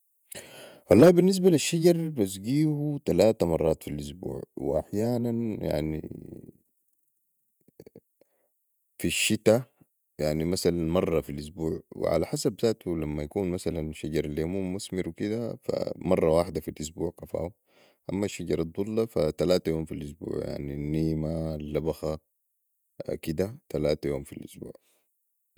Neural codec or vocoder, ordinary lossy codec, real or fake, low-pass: vocoder, 48 kHz, 128 mel bands, Vocos; none; fake; none